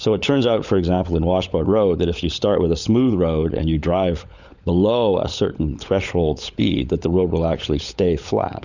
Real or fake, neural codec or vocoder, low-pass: fake; codec, 16 kHz, 16 kbps, FunCodec, trained on LibriTTS, 50 frames a second; 7.2 kHz